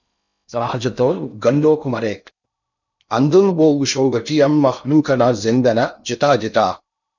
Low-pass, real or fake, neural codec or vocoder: 7.2 kHz; fake; codec, 16 kHz in and 24 kHz out, 0.6 kbps, FocalCodec, streaming, 4096 codes